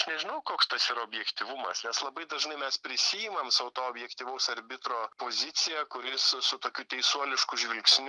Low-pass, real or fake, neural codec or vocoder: 10.8 kHz; real; none